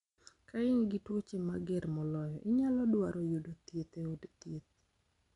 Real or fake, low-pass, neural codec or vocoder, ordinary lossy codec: real; 10.8 kHz; none; none